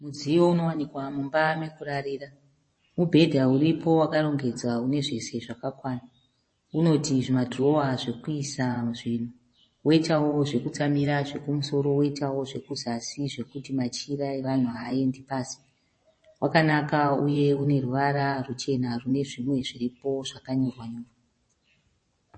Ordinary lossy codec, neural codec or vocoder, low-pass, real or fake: MP3, 32 kbps; vocoder, 24 kHz, 100 mel bands, Vocos; 9.9 kHz; fake